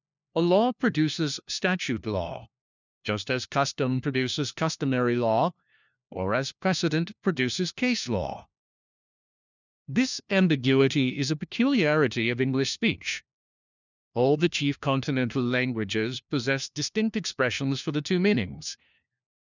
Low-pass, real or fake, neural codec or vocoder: 7.2 kHz; fake; codec, 16 kHz, 1 kbps, FunCodec, trained on LibriTTS, 50 frames a second